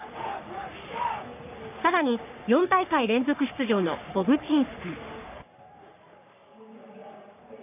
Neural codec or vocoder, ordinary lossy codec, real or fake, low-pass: codec, 44.1 kHz, 3.4 kbps, Pupu-Codec; none; fake; 3.6 kHz